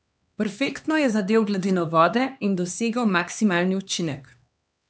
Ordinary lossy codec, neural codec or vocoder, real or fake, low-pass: none; codec, 16 kHz, 2 kbps, X-Codec, HuBERT features, trained on LibriSpeech; fake; none